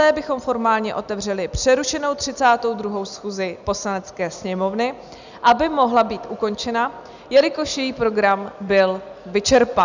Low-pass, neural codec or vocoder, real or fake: 7.2 kHz; none; real